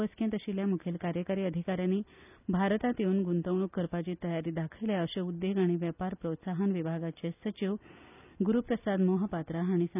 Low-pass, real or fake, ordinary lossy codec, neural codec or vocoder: 3.6 kHz; real; none; none